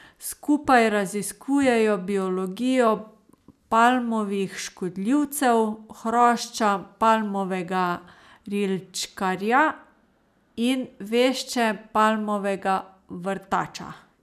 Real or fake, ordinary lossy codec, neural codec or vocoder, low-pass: real; none; none; 14.4 kHz